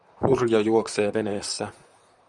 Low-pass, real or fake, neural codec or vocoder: 10.8 kHz; fake; vocoder, 44.1 kHz, 128 mel bands, Pupu-Vocoder